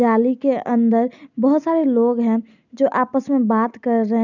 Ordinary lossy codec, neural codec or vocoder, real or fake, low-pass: none; none; real; 7.2 kHz